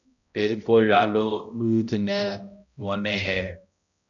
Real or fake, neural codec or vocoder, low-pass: fake; codec, 16 kHz, 0.5 kbps, X-Codec, HuBERT features, trained on balanced general audio; 7.2 kHz